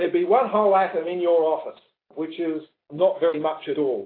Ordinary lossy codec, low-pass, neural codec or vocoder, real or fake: AAC, 24 kbps; 5.4 kHz; none; real